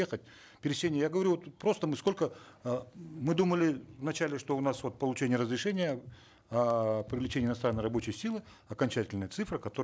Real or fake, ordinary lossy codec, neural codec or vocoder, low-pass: real; none; none; none